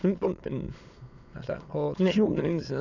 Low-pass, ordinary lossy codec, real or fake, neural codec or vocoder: 7.2 kHz; none; fake; autoencoder, 22.05 kHz, a latent of 192 numbers a frame, VITS, trained on many speakers